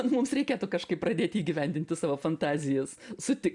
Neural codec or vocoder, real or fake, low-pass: none; real; 10.8 kHz